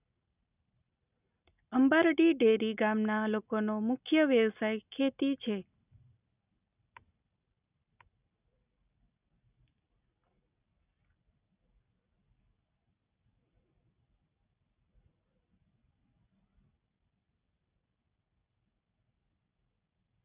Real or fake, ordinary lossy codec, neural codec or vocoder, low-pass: real; none; none; 3.6 kHz